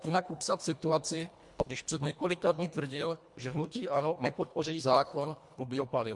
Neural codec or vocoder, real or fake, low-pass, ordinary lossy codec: codec, 24 kHz, 1.5 kbps, HILCodec; fake; 10.8 kHz; MP3, 64 kbps